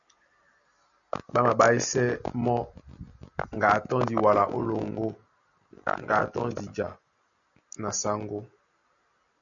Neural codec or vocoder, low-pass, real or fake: none; 7.2 kHz; real